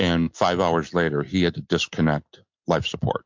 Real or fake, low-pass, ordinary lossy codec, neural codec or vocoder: real; 7.2 kHz; MP3, 48 kbps; none